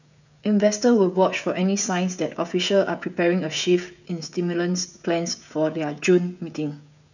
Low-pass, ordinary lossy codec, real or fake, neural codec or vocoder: 7.2 kHz; none; fake; codec, 16 kHz, 16 kbps, FreqCodec, smaller model